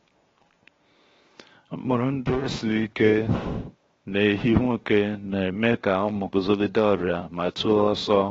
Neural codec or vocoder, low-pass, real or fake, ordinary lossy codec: codec, 16 kHz, 0.7 kbps, FocalCodec; 7.2 kHz; fake; AAC, 24 kbps